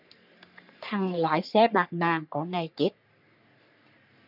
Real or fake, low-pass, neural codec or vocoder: fake; 5.4 kHz; codec, 44.1 kHz, 3.4 kbps, Pupu-Codec